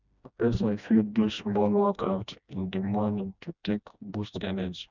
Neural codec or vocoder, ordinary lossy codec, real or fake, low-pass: codec, 16 kHz, 1 kbps, FreqCodec, smaller model; none; fake; 7.2 kHz